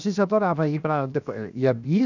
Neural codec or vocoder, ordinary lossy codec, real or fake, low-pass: codec, 16 kHz, 0.7 kbps, FocalCodec; none; fake; 7.2 kHz